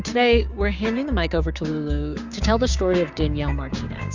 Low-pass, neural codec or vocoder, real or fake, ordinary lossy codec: 7.2 kHz; codec, 16 kHz, 6 kbps, DAC; fake; Opus, 64 kbps